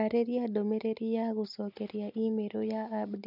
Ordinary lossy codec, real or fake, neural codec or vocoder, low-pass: none; real; none; 5.4 kHz